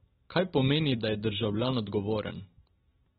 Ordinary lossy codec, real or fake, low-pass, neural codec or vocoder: AAC, 16 kbps; real; 7.2 kHz; none